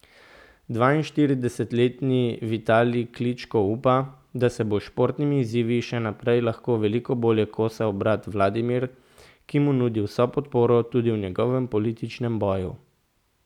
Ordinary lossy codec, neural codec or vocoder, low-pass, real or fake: none; none; 19.8 kHz; real